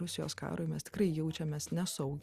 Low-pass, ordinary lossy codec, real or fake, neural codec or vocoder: 14.4 kHz; Opus, 64 kbps; real; none